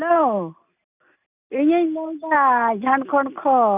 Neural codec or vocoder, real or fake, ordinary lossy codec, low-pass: none; real; none; 3.6 kHz